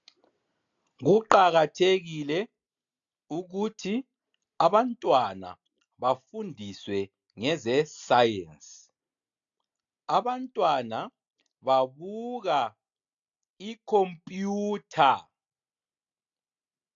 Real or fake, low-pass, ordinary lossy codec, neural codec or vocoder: real; 7.2 kHz; AAC, 64 kbps; none